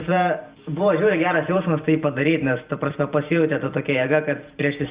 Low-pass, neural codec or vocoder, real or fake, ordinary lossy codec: 3.6 kHz; none; real; Opus, 64 kbps